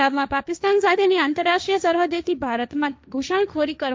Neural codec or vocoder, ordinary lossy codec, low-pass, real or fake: codec, 16 kHz, 1.1 kbps, Voila-Tokenizer; none; none; fake